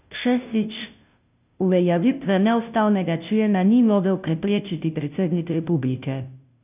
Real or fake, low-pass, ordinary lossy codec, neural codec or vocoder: fake; 3.6 kHz; none; codec, 16 kHz, 0.5 kbps, FunCodec, trained on Chinese and English, 25 frames a second